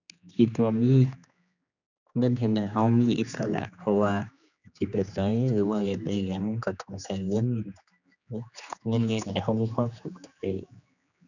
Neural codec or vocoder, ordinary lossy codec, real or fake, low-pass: codec, 16 kHz, 2 kbps, X-Codec, HuBERT features, trained on general audio; none; fake; 7.2 kHz